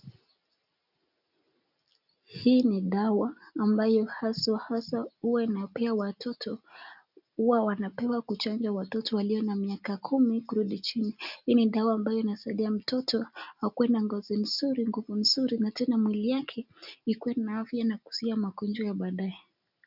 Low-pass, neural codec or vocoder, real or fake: 5.4 kHz; none; real